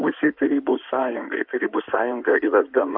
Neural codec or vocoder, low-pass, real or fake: codec, 16 kHz in and 24 kHz out, 2.2 kbps, FireRedTTS-2 codec; 5.4 kHz; fake